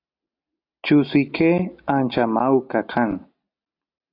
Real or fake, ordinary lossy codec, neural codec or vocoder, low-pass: real; AAC, 32 kbps; none; 5.4 kHz